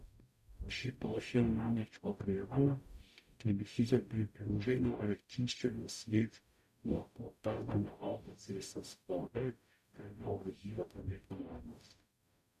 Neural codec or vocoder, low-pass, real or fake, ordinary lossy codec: codec, 44.1 kHz, 0.9 kbps, DAC; 14.4 kHz; fake; none